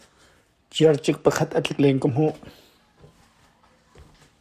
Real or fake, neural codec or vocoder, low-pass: fake; codec, 44.1 kHz, 7.8 kbps, Pupu-Codec; 14.4 kHz